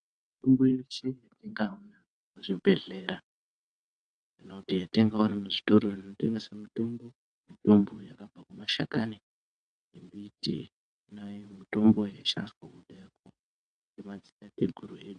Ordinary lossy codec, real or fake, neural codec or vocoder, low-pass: AAC, 64 kbps; fake; vocoder, 22.05 kHz, 80 mel bands, WaveNeXt; 9.9 kHz